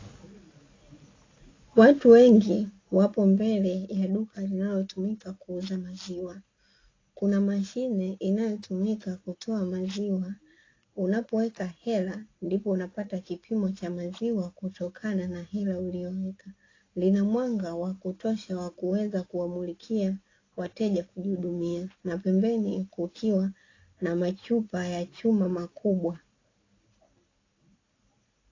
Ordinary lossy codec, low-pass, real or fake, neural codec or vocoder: AAC, 32 kbps; 7.2 kHz; real; none